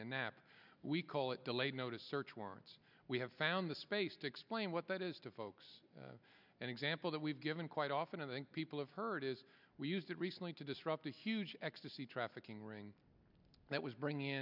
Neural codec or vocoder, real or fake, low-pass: none; real; 5.4 kHz